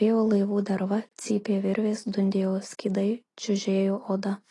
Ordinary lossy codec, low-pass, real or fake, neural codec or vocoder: AAC, 32 kbps; 10.8 kHz; real; none